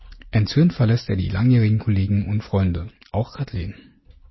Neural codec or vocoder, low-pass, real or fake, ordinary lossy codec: none; 7.2 kHz; real; MP3, 24 kbps